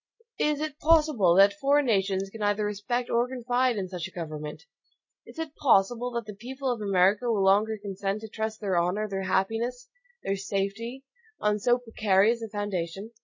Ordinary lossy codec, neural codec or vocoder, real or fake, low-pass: MP3, 32 kbps; none; real; 7.2 kHz